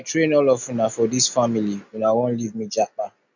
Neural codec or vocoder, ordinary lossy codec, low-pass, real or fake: none; none; 7.2 kHz; real